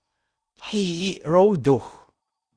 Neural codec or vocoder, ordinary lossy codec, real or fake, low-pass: codec, 16 kHz in and 24 kHz out, 0.8 kbps, FocalCodec, streaming, 65536 codes; Opus, 64 kbps; fake; 9.9 kHz